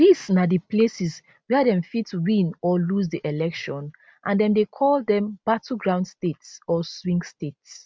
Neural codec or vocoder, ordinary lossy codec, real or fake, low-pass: none; none; real; none